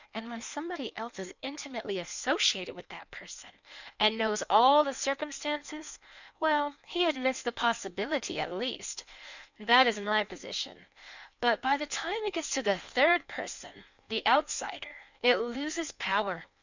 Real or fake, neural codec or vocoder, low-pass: fake; codec, 16 kHz in and 24 kHz out, 1.1 kbps, FireRedTTS-2 codec; 7.2 kHz